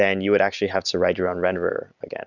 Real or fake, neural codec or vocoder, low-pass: real; none; 7.2 kHz